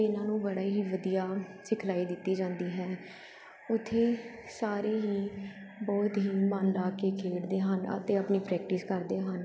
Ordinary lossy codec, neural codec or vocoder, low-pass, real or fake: none; none; none; real